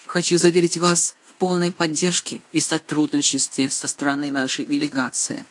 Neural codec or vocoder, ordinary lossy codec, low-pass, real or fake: codec, 16 kHz in and 24 kHz out, 0.9 kbps, LongCat-Audio-Codec, fine tuned four codebook decoder; AAC, 64 kbps; 10.8 kHz; fake